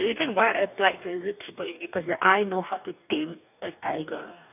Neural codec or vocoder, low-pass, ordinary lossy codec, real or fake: codec, 44.1 kHz, 2.6 kbps, DAC; 3.6 kHz; none; fake